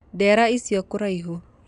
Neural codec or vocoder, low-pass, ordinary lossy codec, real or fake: none; 10.8 kHz; none; real